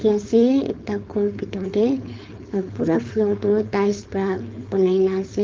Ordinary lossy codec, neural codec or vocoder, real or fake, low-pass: Opus, 32 kbps; codec, 16 kHz, 4.8 kbps, FACodec; fake; 7.2 kHz